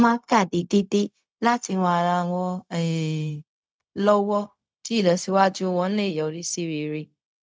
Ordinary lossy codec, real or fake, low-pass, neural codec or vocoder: none; fake; none; codec, 16 kHz, 0.4 kbps, LongCat-Audio-Codec